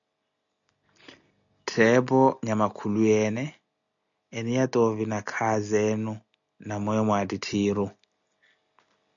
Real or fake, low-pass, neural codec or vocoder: real; 7.2 kHz; none